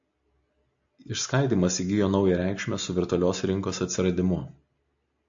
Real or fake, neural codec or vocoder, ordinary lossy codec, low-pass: real; none; AAC, 64 kbps; 7.2 kHz